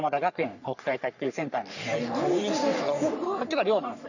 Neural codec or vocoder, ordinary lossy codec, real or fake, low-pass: codec, 44.1 kHz, 3.4 kbps, Pupu-Codec; none; fake; 7.2 kHz